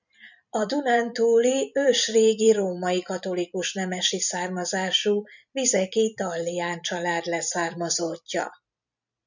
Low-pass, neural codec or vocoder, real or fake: 7.2 kHz; vocoder, 44.1 kHz, 128 mel bands every 256 samples, BigVGAN v2; fake